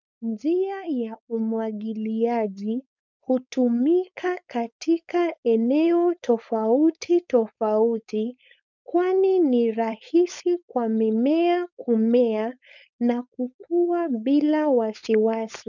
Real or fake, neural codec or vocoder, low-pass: fake; codec, 16 kHz, 4.8 kbps, FACodec; 7.2 kHz